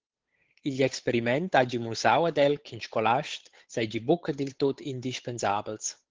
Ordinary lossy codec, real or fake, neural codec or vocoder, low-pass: Opus, 16 kbps; fake; codec, 16 kHz, 8 kbps, FunCodec, trained on Chinese and English, 25 frames a second; 7.2 kHz